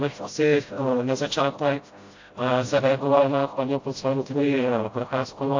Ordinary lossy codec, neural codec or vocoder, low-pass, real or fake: AAC, 48 kbps; codec, 16 kHz, 0.5 kbps, FreqCodec, smaller model; 7.2 kHz; fake